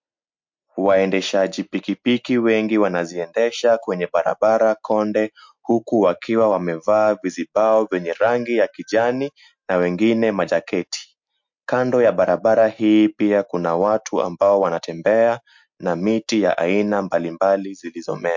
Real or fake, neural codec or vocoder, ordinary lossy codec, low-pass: real; none; MP3, 48 kbps; 7.2 kHz